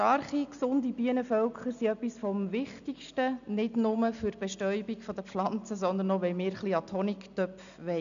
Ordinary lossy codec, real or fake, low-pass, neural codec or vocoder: none; real; 7.2 kHz; none